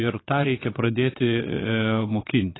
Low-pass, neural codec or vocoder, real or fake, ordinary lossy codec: 7.2 kHz; vocoder, 22.05 kHz, 80 mel bands, Vocos; fake; AAC, 16 kbps